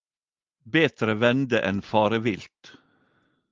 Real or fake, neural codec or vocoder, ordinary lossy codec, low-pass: real; none; Opus, 32 kbps; 7.2 kHz